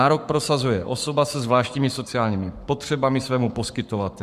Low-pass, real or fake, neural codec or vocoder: 14.4 kHz; fake; codec, 44.1 kHz, 7.8 kbps, Pupu-Codec